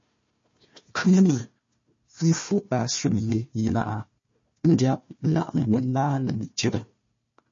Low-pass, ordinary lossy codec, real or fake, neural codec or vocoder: 7.2 kHz; MP3, 32 kbps; fake; codec, 16 kHz, 1 kbps, FunCodec, trained on Chinese and English, 50 frames a second